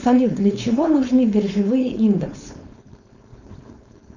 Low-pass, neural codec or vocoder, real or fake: 7.2 kHz; codec, 16 kHz, 4.8 kbps, FACodec; fake